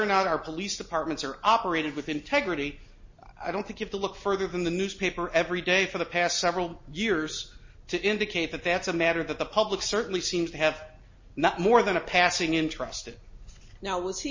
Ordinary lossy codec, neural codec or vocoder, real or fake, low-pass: MP3, 32 kbps; none; real; 7.2 kHz